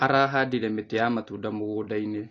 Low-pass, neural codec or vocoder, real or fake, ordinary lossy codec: 7.2 kHz; none; real; AAC, 32 kbps